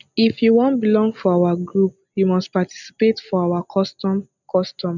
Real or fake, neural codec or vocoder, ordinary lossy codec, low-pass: real; none; none; 7.2 kHz